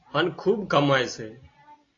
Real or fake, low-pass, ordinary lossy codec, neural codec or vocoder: real; 7.2 kHz; AAC, 32 kbps; none